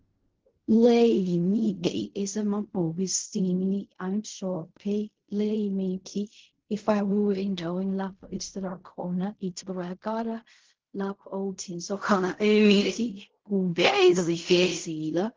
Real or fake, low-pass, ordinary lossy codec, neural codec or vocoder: fake; 7.2 kHz; Opus, 16 kbps; codec, 16 kHz in and 24 kHz out, 0.4 kbps, LongCat-Audio-Codec, fine tuned four codebook decoder